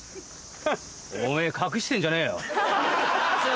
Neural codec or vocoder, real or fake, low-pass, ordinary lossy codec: none; real; none; none